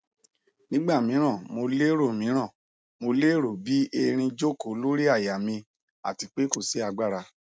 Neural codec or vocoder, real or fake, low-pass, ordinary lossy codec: none; real; none; none